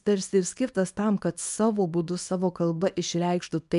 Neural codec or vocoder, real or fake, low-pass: codec, 24 kHz, 0.9 kbps, WavTokenizer, small release; fake; 10.8 kHz